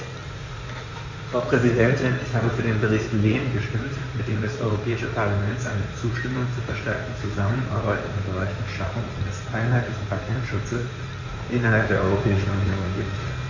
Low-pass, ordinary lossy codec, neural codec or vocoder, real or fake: 7.2 kHz; MP3, 48 kbps; codec, 16 kHz in and 24 kHz out, 2.2 kbps, FireRedTTS-2 codec; fake